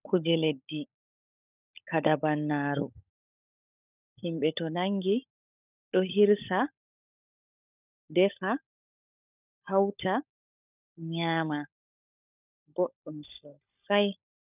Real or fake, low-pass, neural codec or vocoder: fake; 3.6 kHz; codec, 16 kHz, 16 kbps, FunCodec, trained on LibriTTS, 50 frames a second